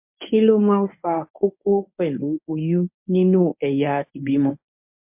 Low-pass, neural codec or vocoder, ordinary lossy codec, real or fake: 3.6 kHz; codec, 24 kHz, 0.9 kbps, WavTokenizer, medium speech release version 1; MP3, 32 kbps; fake